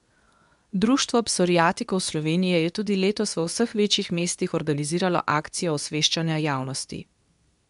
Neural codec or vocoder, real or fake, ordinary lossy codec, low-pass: codec, 24 kHz, 0.9 kbps, WavTokenizer, medium speech release version 2; fake; none; 10.8 kHz